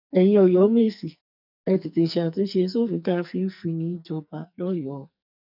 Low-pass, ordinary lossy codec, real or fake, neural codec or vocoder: 5.4 kHz; none; fake; codec, 44.1 kHz, 2.6 kbps, SNAC